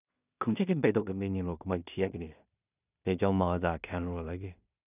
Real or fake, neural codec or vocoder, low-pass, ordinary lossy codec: fake; codec, 16 kHz in and 24 kHz out, 0.4 kbps, LongCat-Audio-Codec, two codebook decoder; 3.6 kHz; none